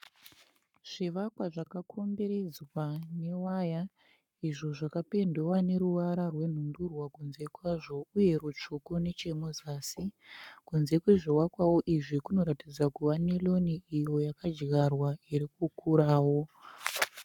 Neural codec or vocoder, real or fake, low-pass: codec, 44.1 kHz, 7.8 kbps, Pupu-Codec; fake; 19.8 kHz